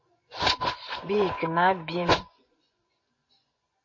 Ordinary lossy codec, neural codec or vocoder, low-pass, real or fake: MP3, 32 kbps; vocoder, 24 kHz, 100 mel bands, Vocos; 7.2 kHz; fake